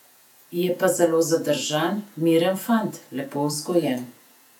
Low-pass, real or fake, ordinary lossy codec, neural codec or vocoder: 19.8 kHz; fake; none; vocoder, 48 kHz, 128 mel bands, Vocos